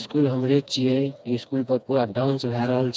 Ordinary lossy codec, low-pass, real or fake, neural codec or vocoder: none; none; fake; codec, 16 kHz, 2 kbps, FreqCodec, smaller model